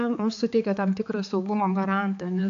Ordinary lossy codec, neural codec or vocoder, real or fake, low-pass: AAC, 64 kbps; codec, 16 kHz, 4 kbps, X-Codec, HuBERT features, trained on balanced general audio; fake; 7.2 kHz